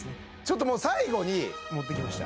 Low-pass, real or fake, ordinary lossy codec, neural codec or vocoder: none; real; none; none